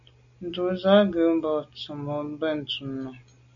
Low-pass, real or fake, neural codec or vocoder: 7.2 kHz; real; none